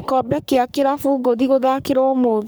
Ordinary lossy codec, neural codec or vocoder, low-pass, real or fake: none; codec, 44.1 kHz, 3.4 kbps, Pupu-Codec; none; fake